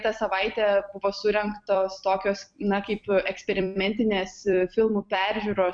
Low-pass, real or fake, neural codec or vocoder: 9.9 kHz; fake; vocoder, 44.1 kHz, 128 mel bands every 256 samples, BigVGAN v2